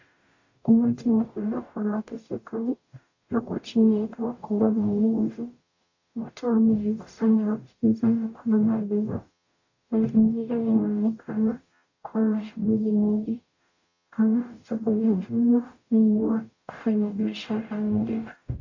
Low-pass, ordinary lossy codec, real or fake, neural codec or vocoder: 7.2 kHz; AAC, 48 kbps; fake; codec, 44.1 kHz, 0.9 kbps, DAC